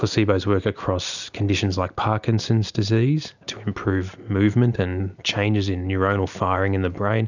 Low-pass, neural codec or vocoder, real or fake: 7.2 kHz; none; real